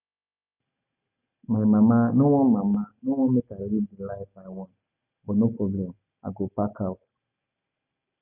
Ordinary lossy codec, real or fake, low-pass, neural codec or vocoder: none; real; 3.6 kHz; none